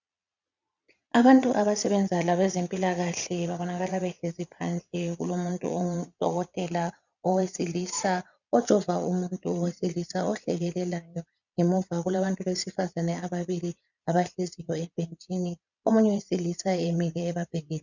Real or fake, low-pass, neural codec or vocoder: fake; 7.2 kHz; vocoder, 22.05 kHz, 80 mel bands, Vocos